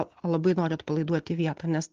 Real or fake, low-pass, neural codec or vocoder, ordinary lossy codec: real; 7.2 kHz; none; Opus, 16 kbps